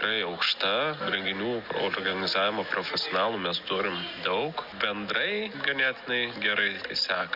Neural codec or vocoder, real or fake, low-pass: none; real; 5.4 kHz